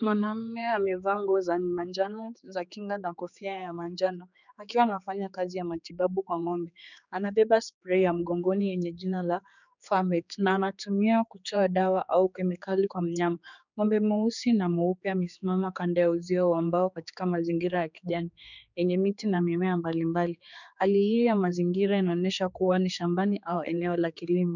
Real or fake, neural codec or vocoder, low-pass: fake; codec, 16 kHz, 4 kbps, X-Codec, HuBERT features, trained on general audio; 7.2 kHz